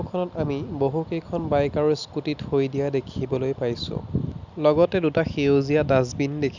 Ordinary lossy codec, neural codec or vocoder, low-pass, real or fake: none; none; 7.2 kHz; real